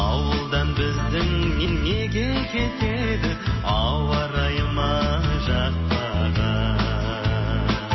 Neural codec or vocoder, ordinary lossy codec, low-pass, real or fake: none; MP3, 24 kbps; 7.2 kHz; real